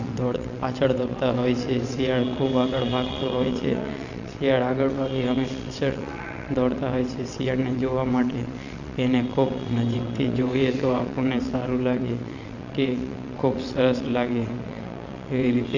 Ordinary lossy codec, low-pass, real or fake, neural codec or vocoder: none; 7.2 kHz; fake; vocoder, 22.05 kHz, 80 mel bands, WaveNeXt